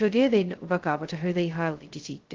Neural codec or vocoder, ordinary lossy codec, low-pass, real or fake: codec, 16 kHz, 0.2 kbps, FocalCodec; Opus, 16 kbps; 7.2 kHz; fake